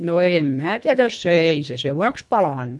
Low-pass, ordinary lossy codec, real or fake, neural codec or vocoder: none; none; fake; codec, 24 kHz, 1.5 kbps, HILCodec